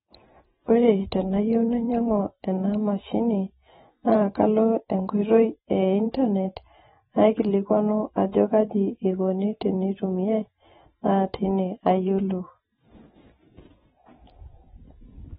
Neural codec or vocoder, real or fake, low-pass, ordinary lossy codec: vocoder, 44.1 kHz, 128 mel bands every 512 samples, BigVGAN v2; fake; 19.8 kHz; AAC, 16 kbps